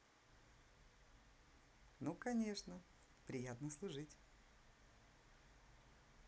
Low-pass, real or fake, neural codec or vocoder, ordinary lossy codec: none; real; none; none